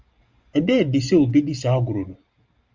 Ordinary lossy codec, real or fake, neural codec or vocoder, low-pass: Opus, 32 kbps; real; none; 7.2 kHz